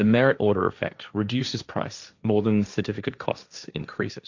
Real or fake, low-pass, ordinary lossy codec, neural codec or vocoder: fake; 7.2 kHz; Opus, 64 kbps; codec, 16 kHz, 1.1 kbps, Voila-Tokenizer